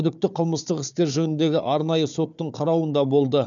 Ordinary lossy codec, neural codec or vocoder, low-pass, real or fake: none; codec, 16 kHz, 4 kbps, FunCodec, trained on Chinese and English, 50 frames a second; 7.2 kHz; fake